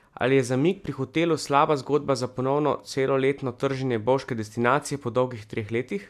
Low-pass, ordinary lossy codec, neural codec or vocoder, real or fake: 14.4 kHz; MP3, 96 kbps; none; real